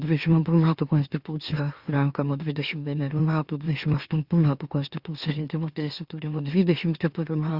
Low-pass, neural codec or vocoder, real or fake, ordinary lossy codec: 5.4 kHz; autoencoder, 44.1 kHz, a latent of 192 numbers a frame, MeloTTS; fake; Opus, 64 kbps